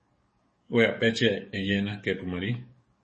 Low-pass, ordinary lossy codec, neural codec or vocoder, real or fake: 10.8 kHz; MP3, 32 kbps; codec, 44.1 kHz, 7.8 kbps, DAC; fake